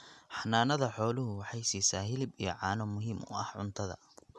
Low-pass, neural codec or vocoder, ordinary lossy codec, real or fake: none; none; none; real